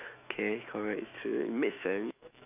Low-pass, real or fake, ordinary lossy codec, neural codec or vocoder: 3.6 kHz; fake; none; autoencoder, 48 kHz, 128 numbers a frame, DAC-VAE, trained on Japanese speech